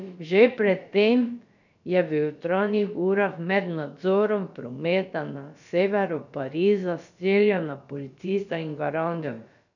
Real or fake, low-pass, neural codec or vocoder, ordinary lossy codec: fake; 7.2 kHz; codec, 16 kHz, about 1 kbps, DyCAST, with the encoder's durations; none